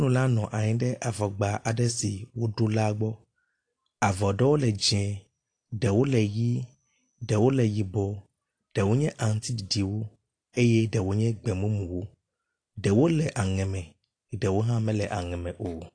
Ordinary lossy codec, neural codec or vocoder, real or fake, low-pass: AAC, 48 kbps; none; real; 9.9 kHz